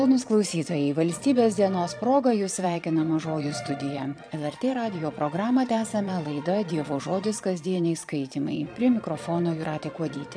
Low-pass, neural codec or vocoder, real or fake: 9.9 kHz; vocoder, 22.05 kHz, 80 mel bands, Vocos; fake